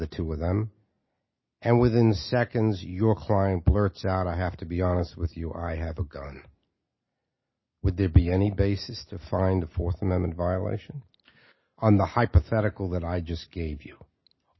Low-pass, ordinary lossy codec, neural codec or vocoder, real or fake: 7.2 kHz; MP3, 24 kbps; none; real